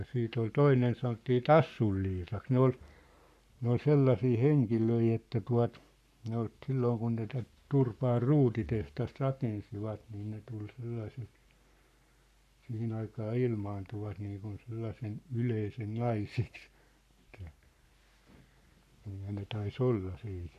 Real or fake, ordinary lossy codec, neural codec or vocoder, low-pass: fake; none; codec, 44.1 kHz, 7.8 kbps, Pupu-Codec; 14.4 kHz